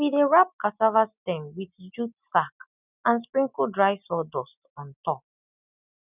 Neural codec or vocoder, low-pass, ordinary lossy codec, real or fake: none; 3.6 kHz; none; real